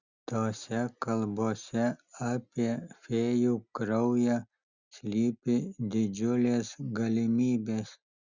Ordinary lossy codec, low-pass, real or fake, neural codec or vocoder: Opus, 64 kbps; 7.2 kHz; real; none